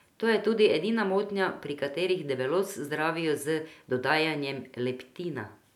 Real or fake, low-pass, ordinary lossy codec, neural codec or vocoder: real; 19.8 kHz; none; none